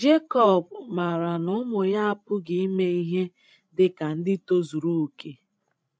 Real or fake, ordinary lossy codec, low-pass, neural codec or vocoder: fake; none; none; codec, 16 kHz, 16 kbps, FreqCodec, larger model